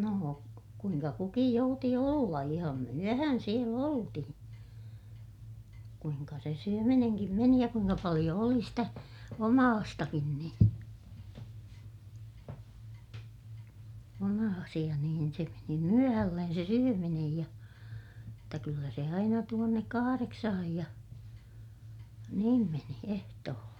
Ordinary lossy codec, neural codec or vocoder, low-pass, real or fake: none; none; 19.8 kHz; real